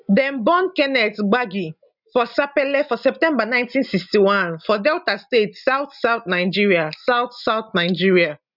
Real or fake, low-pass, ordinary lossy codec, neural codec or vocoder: real; 5.4 kHz; none; none